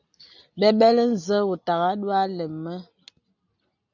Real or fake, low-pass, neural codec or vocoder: real; 7.2 kHz; none